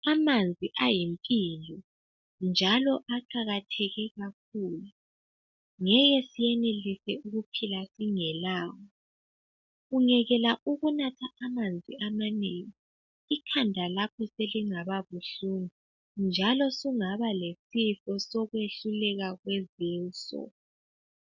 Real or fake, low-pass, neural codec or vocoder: real; 7.2 kHz; none